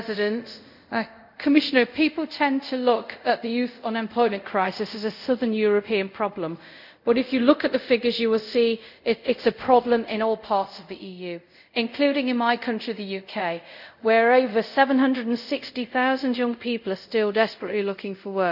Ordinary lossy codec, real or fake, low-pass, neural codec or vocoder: none; fake; 5.4 kHz; codec, 24 kHz, 0.5 kbps, DualCodec